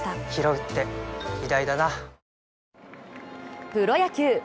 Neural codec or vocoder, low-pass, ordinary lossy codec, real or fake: none; none; none; real